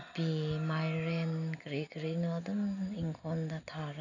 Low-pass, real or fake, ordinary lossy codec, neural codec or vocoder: 7.2 kHz; real; none; none